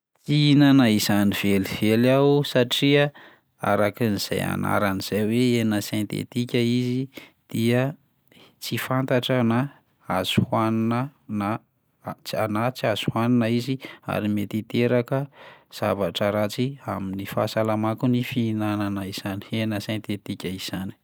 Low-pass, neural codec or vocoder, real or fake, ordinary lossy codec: none; none; real; none